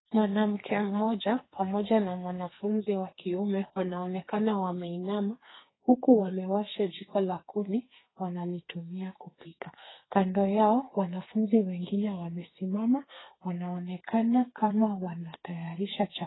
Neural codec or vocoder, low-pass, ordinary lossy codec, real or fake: codec, 32 kHz, 1.9 kbps, SNAC; 7.2 kHz; AAC, 16 kbps; fake